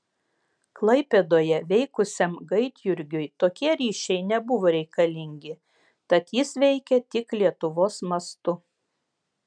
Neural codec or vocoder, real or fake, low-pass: none; real; 9.9 kHz